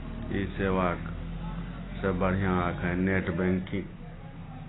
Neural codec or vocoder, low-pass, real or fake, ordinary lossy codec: none; 7.2 kHz; real; AAC, 16 kbps